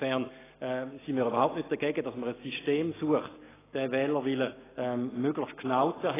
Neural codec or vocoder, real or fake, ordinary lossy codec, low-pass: none; real; AAC, 16 kbps; 3.6 kHz